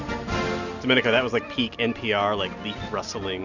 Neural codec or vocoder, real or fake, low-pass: none; real; 7.2 kHz